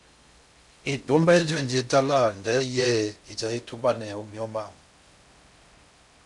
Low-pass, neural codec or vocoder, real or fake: 10.8 kHz; codec, 16 kHz in and 24 kHz out, 0.6 kbps, FocalCodec, streaming, 4096 codes; fake